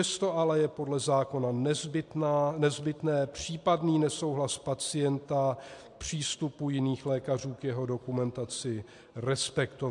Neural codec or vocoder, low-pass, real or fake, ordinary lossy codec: none; 10.8 kHz; real; MP3, 64 kbps